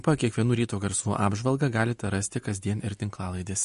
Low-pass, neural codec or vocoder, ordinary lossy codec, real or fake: 10.8 kHz; none; MP3, 48 kbps; real